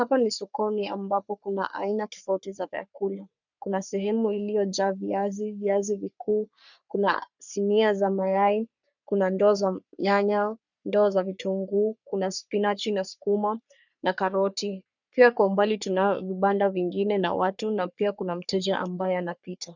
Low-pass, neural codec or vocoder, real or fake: 7.2 kHz; codec, 44.1 kHz, 3.4 kbps, Pupu-Codec; fake